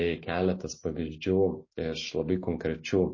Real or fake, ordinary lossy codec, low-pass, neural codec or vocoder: real; MP3, 32 kbps; 7.2 kHz; none